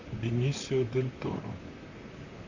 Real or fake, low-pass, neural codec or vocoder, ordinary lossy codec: fake; 7.2 kHz; vocoder, 44.1 kHz, 128 mel bands, Pupu-Vocoder; AAC, 48 kbps